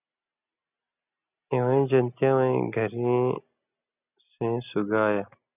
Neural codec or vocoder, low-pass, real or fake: none; 3.6 kHz; real